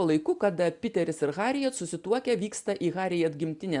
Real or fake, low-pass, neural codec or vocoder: real; 10.8 kHz; none